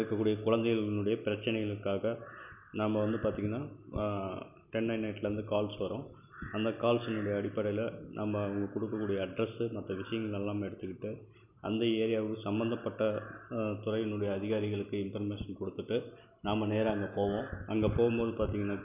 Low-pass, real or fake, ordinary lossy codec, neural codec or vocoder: 3.6 kHz; real; none; none